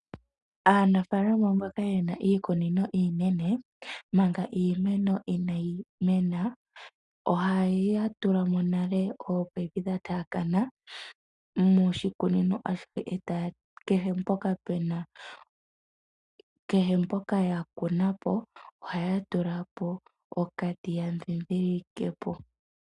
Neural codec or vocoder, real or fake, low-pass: none; real; 10.8 kHz